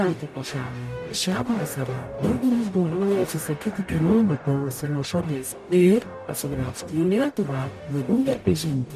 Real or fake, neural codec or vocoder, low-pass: fake; codec, 44.1 kHz, 0.9 kbps, DAC; 14.4 kHz